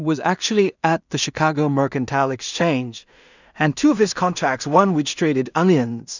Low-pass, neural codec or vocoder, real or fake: 7.2 kHz; codec, 16 kHz in and 24 kHz out, 0.4 kbps, LongCat-Audio-Codec, two codebook decoder; fake